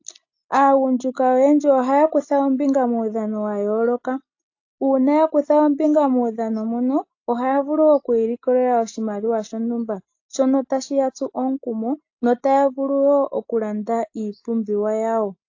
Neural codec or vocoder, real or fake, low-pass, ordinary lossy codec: none; real; 7.2 kHz; AAC, 48 kbps